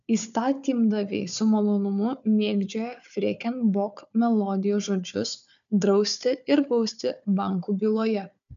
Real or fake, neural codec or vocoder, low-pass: fake; codec, 16 kHz, 4 kbps, FunCodec, trained on Chinese and English, 50 frames a second; 7.2 kHz